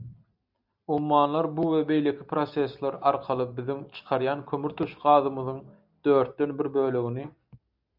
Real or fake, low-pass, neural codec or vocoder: real; 5.4 kHz; none